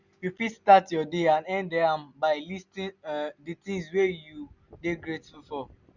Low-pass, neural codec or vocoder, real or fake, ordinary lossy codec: 7.2 kHz; none; real; none